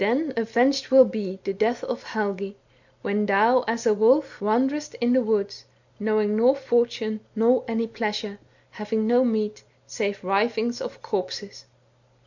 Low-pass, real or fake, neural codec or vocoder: 7.2 kHz; real; none